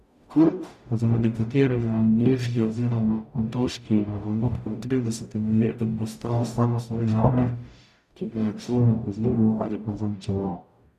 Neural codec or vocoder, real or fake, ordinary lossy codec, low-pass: codec, 44.1 kHz, 0.9 kbps, DAC; fake; none; 14.4 kHz